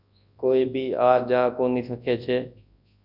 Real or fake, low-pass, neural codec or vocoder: fake; 5.4 kHz; codec, 24 kHz, 0.9 kbps, WavTokenizer, large speech release